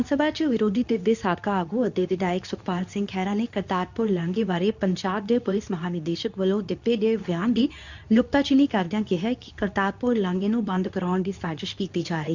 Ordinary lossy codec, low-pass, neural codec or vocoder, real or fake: none; 7.2 kHz; codec, 24 kHz, 0.9 kbps, WavTokenizer, medium speech release version 2; fake